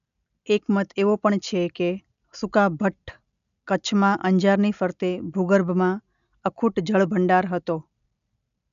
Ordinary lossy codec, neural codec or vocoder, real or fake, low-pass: none; none; real; 7.2 kHz